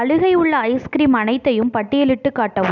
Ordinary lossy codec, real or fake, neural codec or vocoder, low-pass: none; real; none; 7.2 kHz